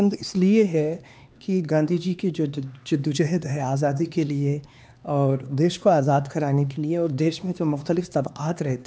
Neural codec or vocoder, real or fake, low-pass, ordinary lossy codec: codec, 16 kHz, 2 kbps, X-Codec, HuBERT features, trained on LibriSpeech; fake; none; none